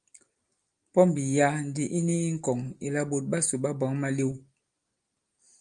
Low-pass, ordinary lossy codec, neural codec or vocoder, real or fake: 9.9 kHz; Opus, 32 kbps; none; real